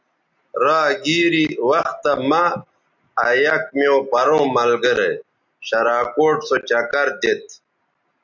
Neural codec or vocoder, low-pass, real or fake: none; 7.2 kHz; real